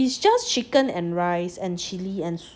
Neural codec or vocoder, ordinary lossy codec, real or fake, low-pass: none; none; real; none